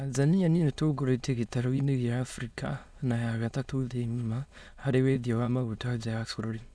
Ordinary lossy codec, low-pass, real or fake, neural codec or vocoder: none; none; fake; autoencoder, 22.05 kHz, a latent of 192 numbers a frame, VITS, trained on many speakers